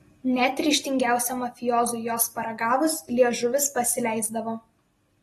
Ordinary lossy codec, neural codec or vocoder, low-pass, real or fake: AAC, 32 kbps; none; 19.8 kHz; real